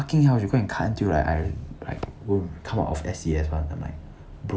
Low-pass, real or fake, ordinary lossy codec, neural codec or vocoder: none; real; none; none